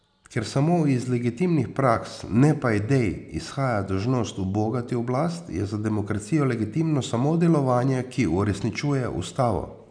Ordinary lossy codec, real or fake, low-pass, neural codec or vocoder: none; real; 9.9 kHz; none